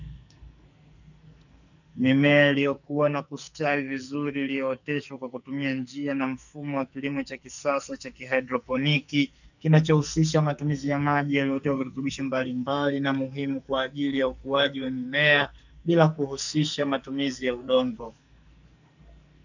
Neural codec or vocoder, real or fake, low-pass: codec, 44.1 kHz, 2.6 kbps, SNAC; fake; 7.2 kHz